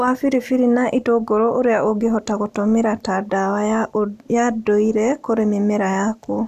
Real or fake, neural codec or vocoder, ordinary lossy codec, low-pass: real; none; none; 14.4 kHz